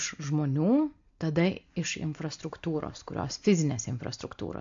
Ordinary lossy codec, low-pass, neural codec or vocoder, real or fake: MP3, 48 kbps; 7.2 kHz; none; real